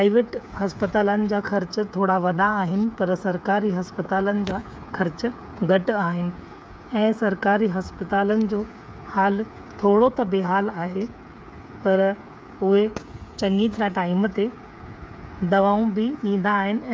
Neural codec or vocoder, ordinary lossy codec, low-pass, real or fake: codec, 16 kHz, 8 kbps, FreqCodec, smaller model; none; none; fake